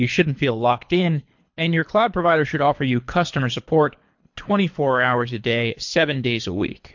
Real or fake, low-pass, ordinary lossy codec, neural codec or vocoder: fake; 7.2 kHz; MP3, 48 kbps; codec, 24 kHz, 3 kbps, HILCodec